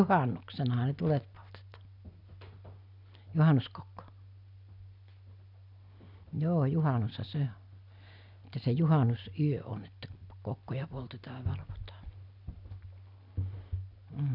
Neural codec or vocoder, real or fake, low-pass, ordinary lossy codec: none; real; 5.4 kHz; none